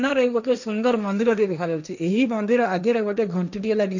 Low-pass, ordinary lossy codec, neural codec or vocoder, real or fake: 7.2 kHz; none; codec, 16 kHz, 1.1 kbps, Voila-Tokenizer; fake